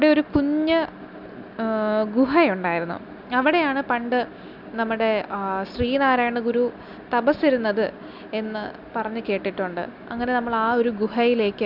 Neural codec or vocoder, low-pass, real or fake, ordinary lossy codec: none; 5.4 kHz; real; none